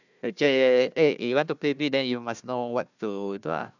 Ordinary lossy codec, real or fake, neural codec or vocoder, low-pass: none; fake; codec, 16 kHz, 1 kbps, FunCodec, trained on Chinese and English, 50 frames a second; 7.2 kHz